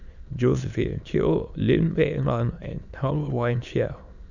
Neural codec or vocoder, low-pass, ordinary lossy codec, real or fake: autoencoder, 22.05 kHz, a latent of 192 numbers a frame, VITS, trained on many speakers; 7.2 kHz; none; fake